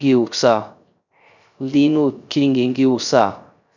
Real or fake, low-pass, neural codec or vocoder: fake; 7.2 kHz; codec, 16 kHz, 0.3 kbps, FocalCodec